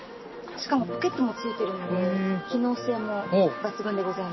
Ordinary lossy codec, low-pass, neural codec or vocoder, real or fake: MP3, 24 kbps; 7.2 kHz; none; real